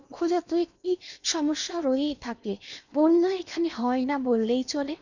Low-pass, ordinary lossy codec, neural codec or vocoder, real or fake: 7.2 kHz; none; codec, 16 kHz in and 24 kHz out, 0.8 kbps, FocalCodec, streaming, 65536 codes; fake